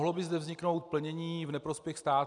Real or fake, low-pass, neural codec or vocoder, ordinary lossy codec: real; 10.8 kHz; none; MP3, 96 kbps